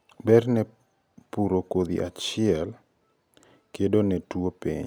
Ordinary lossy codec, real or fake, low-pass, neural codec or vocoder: none; real; none; none